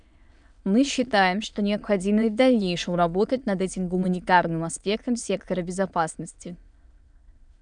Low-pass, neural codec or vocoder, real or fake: 9.9 kHz; autoencoder, 22.05 kHz, a latent of 192 numbers a frame, VITS, trained on many speakers; fake